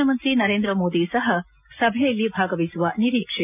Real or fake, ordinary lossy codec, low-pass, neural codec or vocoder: real; none; 3.6 kHz; none